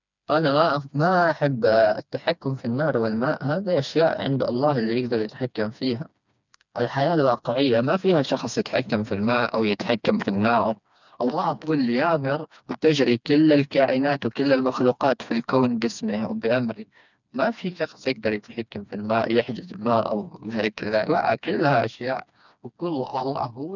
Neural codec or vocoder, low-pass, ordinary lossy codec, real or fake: codec, 16 kHz, 2 kbps, FreqCodec, smaller model; 7.2 kHz; none; fake